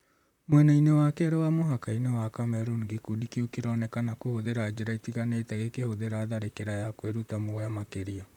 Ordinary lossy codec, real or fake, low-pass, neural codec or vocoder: none; fake; 19.8 kHz; vocoder, 44.1 kHz, 128 mel bands, Pupu-Vocoder